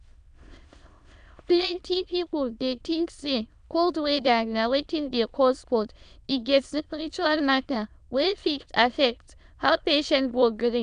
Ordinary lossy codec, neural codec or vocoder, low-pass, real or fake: none; autoencoder, 22.05 kHz, a latent of 192 numbers a frame, VITS, trained on many speakers; 9.9 kHz; fake